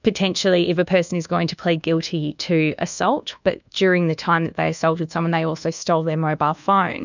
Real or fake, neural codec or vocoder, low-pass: fake; codec, 24 kHz, 1.2 kbps, DualCodec; 7.2 kHz